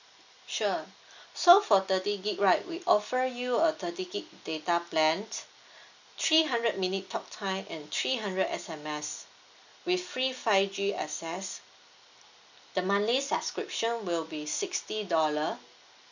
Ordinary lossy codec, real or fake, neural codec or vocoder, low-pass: none; real; none; 7.2 kHz